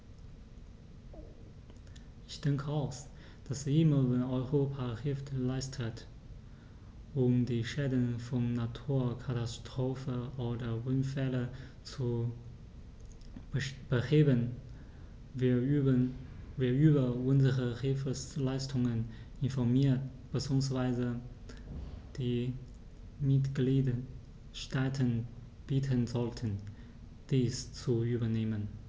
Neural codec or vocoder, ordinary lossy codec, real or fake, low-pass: none; none; real; none